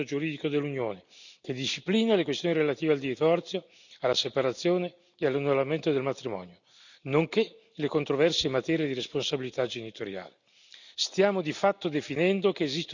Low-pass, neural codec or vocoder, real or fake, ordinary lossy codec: 7.2 kHz; none; real; none